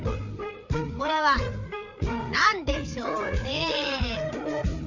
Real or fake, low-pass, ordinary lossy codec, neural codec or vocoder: fake; 7.2 kHz; none; codec, 16 kHz, 4 kbps, FreqCodec, larger model